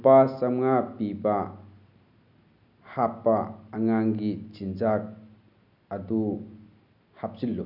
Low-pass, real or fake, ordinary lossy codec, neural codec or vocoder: 5.4 kHz; real; none; none